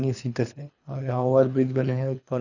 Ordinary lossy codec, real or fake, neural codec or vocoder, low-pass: none; fake; codec, 24 kHz, 3 kbps, HILCodec; 7.2 kHz